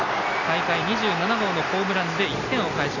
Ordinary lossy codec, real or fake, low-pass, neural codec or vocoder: none; real; 7.2 kHz; none